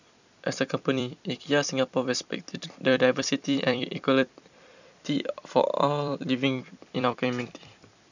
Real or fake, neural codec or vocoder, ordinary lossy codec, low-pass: fake; vocoder, 44.1 kHz, 128 mel bands every 256 samples, BigVGAN v2; none; 7.2 kHz